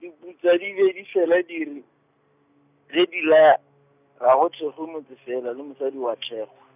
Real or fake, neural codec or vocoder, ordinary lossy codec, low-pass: real; none; none; 3.6 kHz